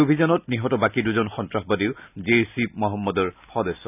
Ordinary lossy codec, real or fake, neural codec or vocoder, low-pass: none; real; none; 3.6 kHz